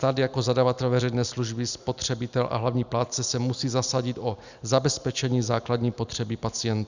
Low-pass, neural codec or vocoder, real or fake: 7.2 kHz; none; real